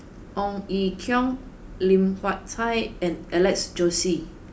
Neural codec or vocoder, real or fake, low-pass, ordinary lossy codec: none; real; none; none